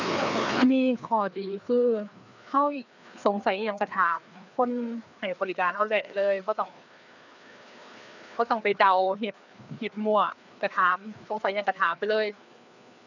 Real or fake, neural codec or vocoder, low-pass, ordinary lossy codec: fake; codec, 16 kHz, 2 kbps, FreqCodec, larger model; 7.2 kHz; none